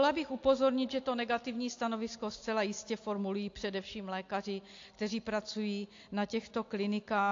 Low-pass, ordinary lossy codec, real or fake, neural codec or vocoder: 7.2 kHz; AAC, 48 kbps; real; none